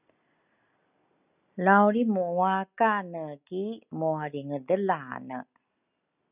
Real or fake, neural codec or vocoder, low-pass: real; none; 3.6 kHz